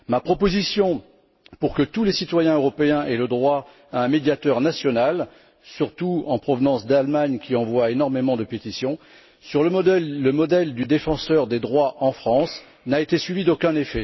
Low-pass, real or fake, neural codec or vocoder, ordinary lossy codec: 7.2 kHz; real; none; MP3, 24 kbps